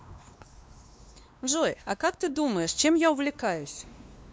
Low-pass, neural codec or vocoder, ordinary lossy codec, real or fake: none; codec, 16 kHz, 2 kbps, X-Codec, WavLM features, trained on Multilingual LibriSpeech; none; fake